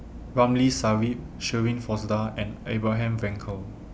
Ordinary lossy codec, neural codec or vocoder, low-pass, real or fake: none; none; none; real